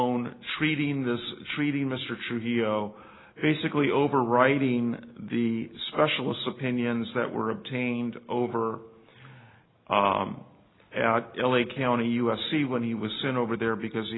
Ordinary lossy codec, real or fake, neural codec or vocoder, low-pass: AAC, 16 kbps; real; none; 7.2 kHz